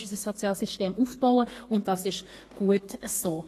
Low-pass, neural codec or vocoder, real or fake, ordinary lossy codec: 14.4 kHz; codec, 32 kHz, 1.9 kbps, SNAC; fake; AAC, 48 kbps